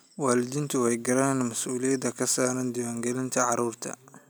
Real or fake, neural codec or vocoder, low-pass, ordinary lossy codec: real; none; none; none